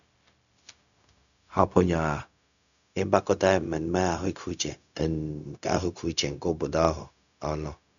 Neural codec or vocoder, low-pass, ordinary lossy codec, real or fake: codec, 16 kHz, 0.4 kbps, LongCat-Audio-Codec; 7.2 kHz; none; fake